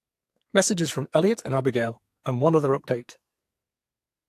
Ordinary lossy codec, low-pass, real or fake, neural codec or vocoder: AAC, 64 kbps; 14.4 kHz; fake; codec, 44.1 kHz, 2.6 kbps, SNAC